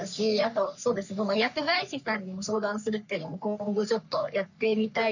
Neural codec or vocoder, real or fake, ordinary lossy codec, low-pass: codec, 44.1 kHz, 3.4 kbps, Pupu-Codec; fake; none; 7.2 kHz